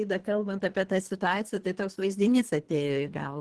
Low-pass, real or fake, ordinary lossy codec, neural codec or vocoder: 10.8 kHz; fake; Opus, 16 kbps; codec, 24 kHz, 3 kbps, HILCodec